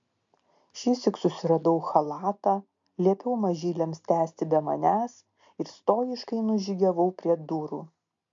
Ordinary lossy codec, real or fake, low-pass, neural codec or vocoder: AAC, 64 kbps; real; 7.2 kHz; none